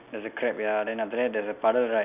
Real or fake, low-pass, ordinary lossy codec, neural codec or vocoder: real; 3.6 kHz; none; none